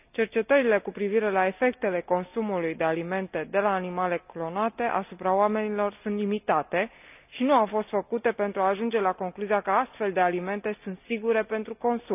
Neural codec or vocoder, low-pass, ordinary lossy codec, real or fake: none; 3.6 kHz; none; real